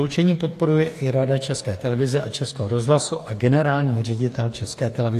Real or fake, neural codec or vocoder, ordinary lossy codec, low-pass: fake; codec, 44.1 kHz, 2.6 kbps, DAC; AAC, 64 kbps; 14.4 kHz